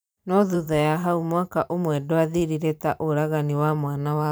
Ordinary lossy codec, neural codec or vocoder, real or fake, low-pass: none; vocoder, 44.1 kHz, 128 mel bands every 512 samples, BigVGAN v2; fake; none